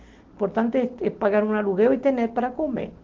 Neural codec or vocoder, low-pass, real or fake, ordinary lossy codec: none; 7.2 kHz; real; Opus, 16 kbps